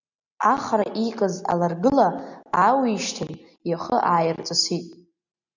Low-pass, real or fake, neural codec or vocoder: 7.2 kHz; real; none